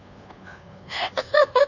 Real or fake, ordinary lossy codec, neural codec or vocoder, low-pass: fake; none; codec, 24 kHz, 1.2 kbps, DualCodec; 7.2 kHz